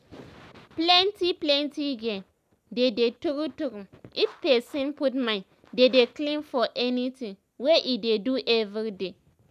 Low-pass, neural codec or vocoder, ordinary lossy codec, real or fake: 14.4 kHz; none; none; real